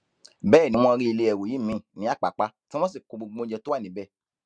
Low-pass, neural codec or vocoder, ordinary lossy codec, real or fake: 9.9 kHz; none; Opus, 64 kbps; real